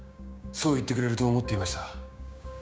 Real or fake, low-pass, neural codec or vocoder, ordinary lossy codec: fake; none; codec, 16 kHz, 6 kbps, DAC; none